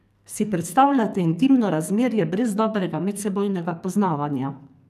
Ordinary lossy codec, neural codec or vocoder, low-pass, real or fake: none; codec, 44.1 kHz, 2.6 kbps, SNAC; 14.4 kHz; fake